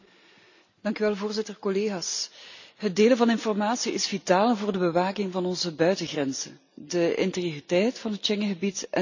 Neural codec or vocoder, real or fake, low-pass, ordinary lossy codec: none; real; 7.2 kHz; none